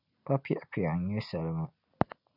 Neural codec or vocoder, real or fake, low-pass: none; real; 5.4 kHz